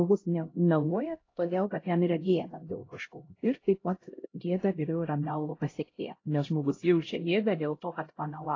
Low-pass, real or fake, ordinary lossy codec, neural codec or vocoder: 7.2 kHz; fake; AAC, 32 kbps; codec, 16 kHz, 0.5 kbps, X-Codec, HuBERT features, trained on LibriSpeech